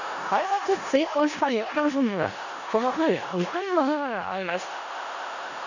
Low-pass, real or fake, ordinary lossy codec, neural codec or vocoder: 7.2 kHz; fake; none; codec, 16 kHz in and 24 kHz out, 0.4 kbps, LongCat-Audio-Codec, four codebook decoder